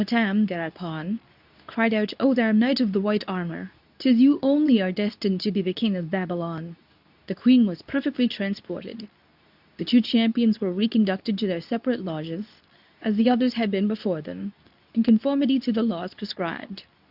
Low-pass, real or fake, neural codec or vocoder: 5.4 kHz; fake; codec, 24 kHz, 0.9 kbps, WavTokenizer, medium speech release version 2